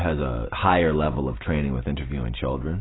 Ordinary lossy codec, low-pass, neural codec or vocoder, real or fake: AAC, 16 kbps; 7.2 kHz; none; real